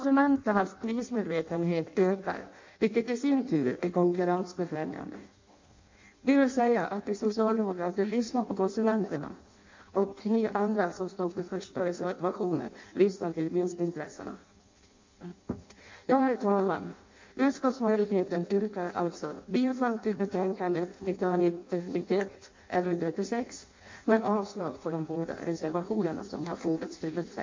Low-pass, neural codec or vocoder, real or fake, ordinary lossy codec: 7.2 kHz; codec, 16 kHz in and 24 kHz out, 0.6 kbps, FireRedTTS-2 codec; fake; MP3, 48 kbps